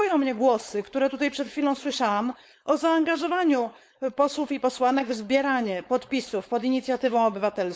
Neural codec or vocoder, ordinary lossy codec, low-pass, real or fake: codec, 16 kHz, 4.8 kbps, FACodec; none; none; fake